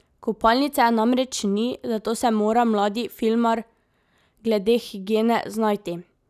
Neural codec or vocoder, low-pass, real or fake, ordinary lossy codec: none; 14.4 kHz; real; none